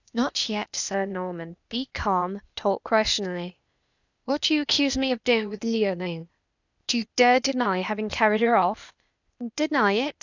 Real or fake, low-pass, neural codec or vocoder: fake; 7.2 kHz; codec, 16 kHz, 0.8 kbps, ZipCodec